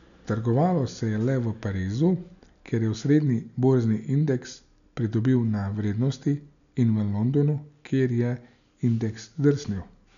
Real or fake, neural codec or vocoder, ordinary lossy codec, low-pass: real; none; none; 7.2 kHz